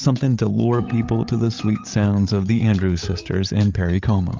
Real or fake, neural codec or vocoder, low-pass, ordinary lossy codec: fake; codec, 16 kHz, 8 kbps, FreqCodec, larger model; 7.2 kHz; Opus, 24 kbps